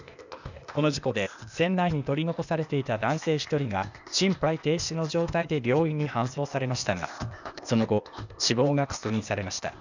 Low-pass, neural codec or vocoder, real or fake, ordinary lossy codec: 7.2 kHz; codec, 16 kHz, 0.8 kbps, ZipCodec; fake; none